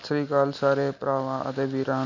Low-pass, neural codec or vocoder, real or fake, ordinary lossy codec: 7.2 kHz; none; real; MP3, 64 kbps